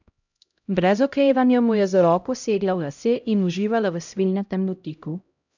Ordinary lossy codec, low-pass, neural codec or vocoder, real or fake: none; 7.2 kHz; codec, 16 kHz, 0.5 kbps, X-Codec, HuBERT features, trained on LibriSpeech; fake